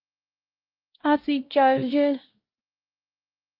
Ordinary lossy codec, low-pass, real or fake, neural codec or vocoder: Opus, 24 kbps; 5.4 kHz; fake; codec, 16 kHz, 0.5 kbps, X-Codec, WavLM features, trained on Multilingual LibriSpeech